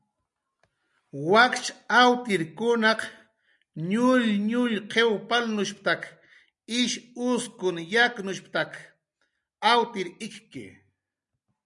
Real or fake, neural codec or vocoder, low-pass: real; none; 10.8 kHz